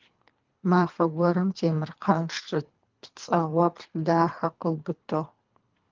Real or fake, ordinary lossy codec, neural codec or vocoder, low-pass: fake; Opus, 32 kbps; codec, 24 kHz, 3 kbps, HILCodec; 7.2 kHz